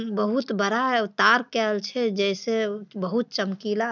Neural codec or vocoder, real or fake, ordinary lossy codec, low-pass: none; real; none; 7.2 kHz